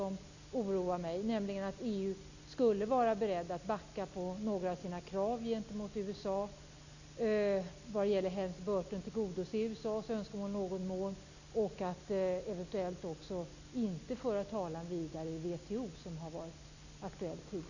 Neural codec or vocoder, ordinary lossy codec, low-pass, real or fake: none; none; 7.2 kHz; real